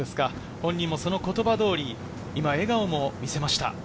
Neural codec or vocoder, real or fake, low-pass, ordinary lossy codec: none; real; none; none